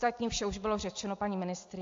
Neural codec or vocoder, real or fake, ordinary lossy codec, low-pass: none; real; AAC, 48 kbps; 7.2 kHz